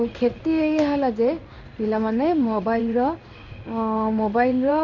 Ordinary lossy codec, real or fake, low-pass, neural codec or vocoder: none; fake; 7.2 kHz; codec, 16 kHz in and 24 kHz out, 1 kbps, XY-Tokenizer